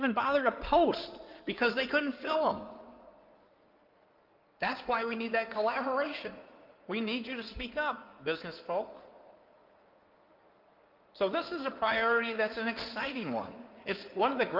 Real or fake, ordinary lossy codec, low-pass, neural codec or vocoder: fake; Opus, 24 kbps; 5.4 kHz; codec, 16 kHz in and 24 kHz out, 2.2 kbps, FireRedTTS-2 codec